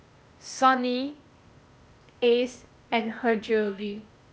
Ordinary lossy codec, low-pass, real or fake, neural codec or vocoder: none; none; fake; codec, 16 kHz, 0.8 kbps, ZipCodec